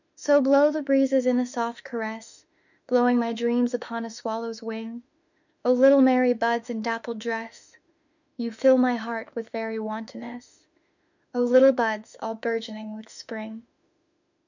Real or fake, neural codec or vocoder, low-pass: fake; autoencoder, 48 kHz, 32 numbers a frame, DAC-VAE, trained on Japanese speech; 7.2 kHz